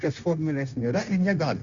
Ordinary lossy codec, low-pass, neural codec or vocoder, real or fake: Opus, 64 kbps; 7.2 kHz; codec, 16 kHz, 0.9 kbps, LongCat-Audio-Codec; fake